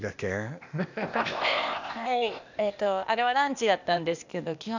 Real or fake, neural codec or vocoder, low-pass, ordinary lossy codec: fake; codec, 16 kHz, 0.8 kbps, ZipCodec; 7.2 kHz; none